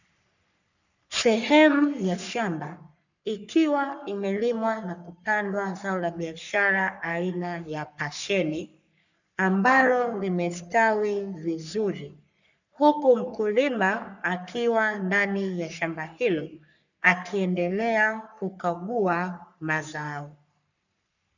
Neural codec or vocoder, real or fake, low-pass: codec, 44.1 kHz, 3.4 kbps, Pupu-Codec; fake; 7.2 kHz